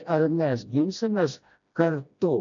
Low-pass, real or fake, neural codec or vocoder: 7.2 kHz; fake; codec, 16 kHz, 1 kbps, FreqCodec, smaller model